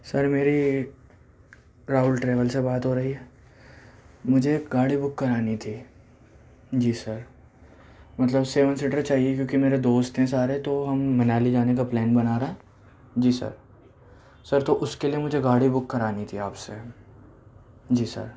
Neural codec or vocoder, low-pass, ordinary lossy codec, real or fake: none; none; none; real